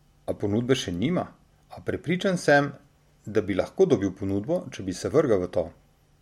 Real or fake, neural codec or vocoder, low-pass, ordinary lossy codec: real; none; 19.8 kHz; MP3, 64 kbps